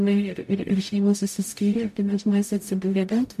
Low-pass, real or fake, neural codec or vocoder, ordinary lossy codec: 14.4 kHz; fake; codec, 44.1 kHz, 0.9 kbps, DAC; MP3, 64 kbps